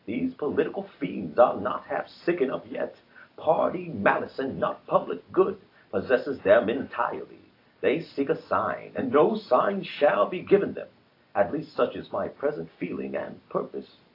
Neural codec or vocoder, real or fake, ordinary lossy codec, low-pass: none; real; AAC, 32 kbps; 5.4 kHz